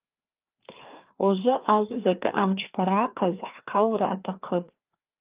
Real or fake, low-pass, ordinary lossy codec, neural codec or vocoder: fake; 3.6 kHz; Opus, 24 kbps; codec, 16 kHz, 2 kbps, FreqCodec, larger model